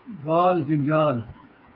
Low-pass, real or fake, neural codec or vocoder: 5.4 kHz; fake; codec, 16 kHz, 4 kbps, FreqCodec, smaller model